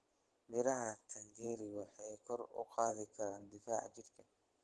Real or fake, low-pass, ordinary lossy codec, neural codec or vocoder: fake; 10.8 kHz; Opus, 16 kbps; vocoder, 44.1 kHz, 128 mel bands every 512 samples, BigVGAN v2